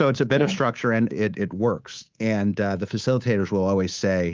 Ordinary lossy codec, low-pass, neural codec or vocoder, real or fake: Opus, 24 kbps; 7.2 kHz; codec, 24 kHz, 3.1 kbps, DualCodec; fake